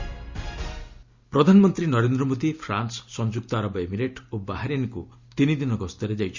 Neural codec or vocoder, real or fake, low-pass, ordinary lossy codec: none; real; 7.2 kHz; Opus, 64 kbps